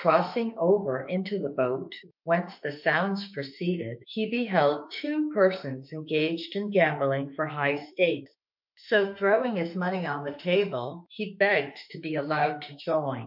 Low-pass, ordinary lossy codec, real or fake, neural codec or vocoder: 5.4 kHz; MP3, 48 kbps; fake; autoencoder, 48 kHz, 32 numbers a frame, DAC-VAE, trained on Japanese speech